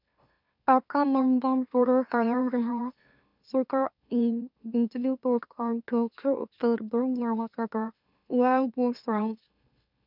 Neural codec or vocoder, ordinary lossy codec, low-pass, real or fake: autoencoder, 44.1 kHz, a latent of 192 numbers a frame, MeloTTS; none; 5.4 kHz; fake